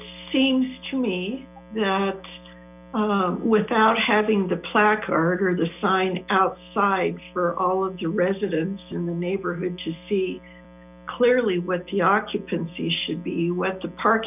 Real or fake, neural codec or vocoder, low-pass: real; none; 3.6 kHz